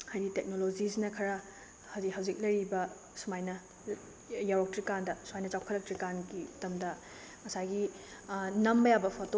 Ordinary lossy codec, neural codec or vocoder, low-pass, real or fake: none; none; none; real